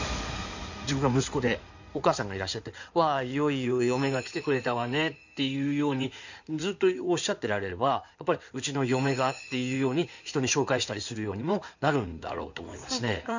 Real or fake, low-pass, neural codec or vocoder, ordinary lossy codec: fake; 7.2 kHz; codec, 16 kHz in and 24 kHz out, 2.2 kbps, FireRedTTS-2 codec; none